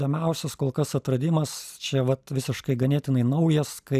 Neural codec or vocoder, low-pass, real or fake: none; 14.4 kHz; real